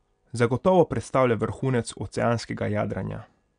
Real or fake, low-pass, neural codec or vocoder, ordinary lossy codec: real; 9.9 kHz; none; none